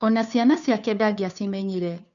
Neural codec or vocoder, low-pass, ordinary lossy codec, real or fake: codec, 16 kHz, 2 kbps, FunCodec, trained on Chinese and English, 25 frames a second; 7.2 kHz; none; fake